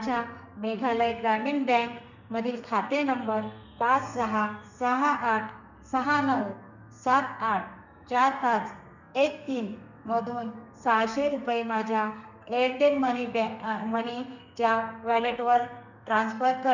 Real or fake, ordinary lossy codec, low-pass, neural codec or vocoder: fake; none; 7.2 kHz; codec, 32 kHz, 1.9 kbps, SNAC